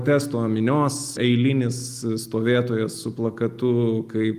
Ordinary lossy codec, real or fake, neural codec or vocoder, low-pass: Opus, 32 kbps; real; none; 14.4 kHz